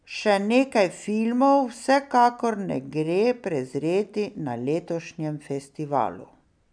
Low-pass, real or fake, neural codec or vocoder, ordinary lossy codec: 9.9 kHz; real; none; none